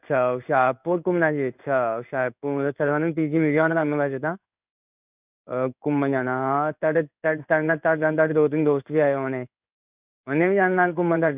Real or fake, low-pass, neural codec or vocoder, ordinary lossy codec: fake; 3.6 kHz; codec, 16 kHz in and 24 kHz out, 1 kbps, XY-Tokenizer; none